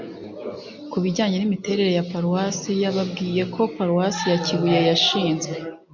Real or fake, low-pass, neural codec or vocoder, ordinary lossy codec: real; 7.2 kHz; none; MP3, 64 kbps